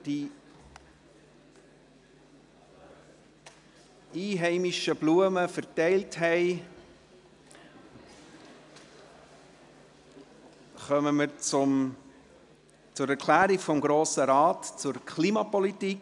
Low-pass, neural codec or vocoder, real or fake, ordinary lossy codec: 10.8 kHz; none; real; none